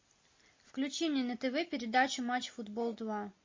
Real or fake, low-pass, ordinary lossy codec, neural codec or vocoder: real; 7.2 kHz; MP3, 32 kbps; none